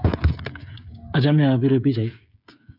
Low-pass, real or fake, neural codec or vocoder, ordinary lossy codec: 5.4 kHz; fake; codec, 16 kHz, 8 kbps, FreqCodec, smaller model; none